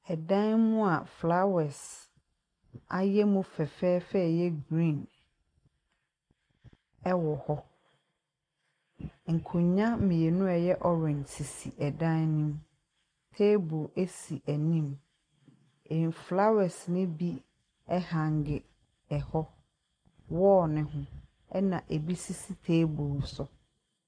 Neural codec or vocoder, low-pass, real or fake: none; 9.9 kHz; real